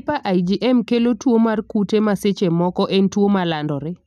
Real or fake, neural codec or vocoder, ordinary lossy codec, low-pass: real; none; none; 14.4 kHz